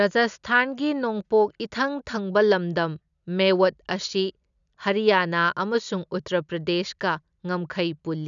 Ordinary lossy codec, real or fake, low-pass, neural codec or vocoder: none; real; 7.2 kHz; none